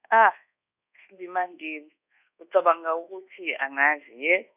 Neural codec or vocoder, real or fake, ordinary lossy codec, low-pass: codec, 24 kHz, 1.2 kbps, DualCodec; fake; none; 3.6 kHz